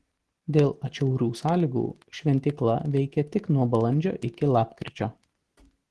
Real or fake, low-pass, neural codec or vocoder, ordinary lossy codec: real; 10.8 kHz; none; Opus, 16 kbps